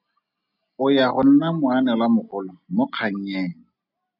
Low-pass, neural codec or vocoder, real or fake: 5.4 kHz; none; real